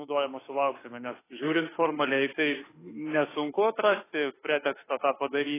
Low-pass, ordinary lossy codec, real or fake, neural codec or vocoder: 3.6 kHz; AAC, 16 kbps; fake; autoencoder, 48 kHz, 32 numbers a frame, DAC-VAE, trained on Japanese speech